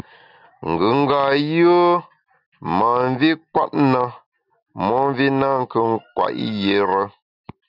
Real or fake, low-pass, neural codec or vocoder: real; 5.4 kHz; none